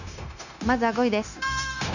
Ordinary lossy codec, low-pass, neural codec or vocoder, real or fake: none; 7.2 kHz; codec, 16 kHz, 0.9 kbps, LongCat-Audio-Codec; fake